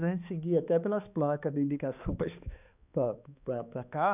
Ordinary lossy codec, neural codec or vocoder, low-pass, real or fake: none; codec, 16 kHz, 2 kbps, X-Codec, HuBERT features, trained on balanced general audio; 3.6 kHz; fake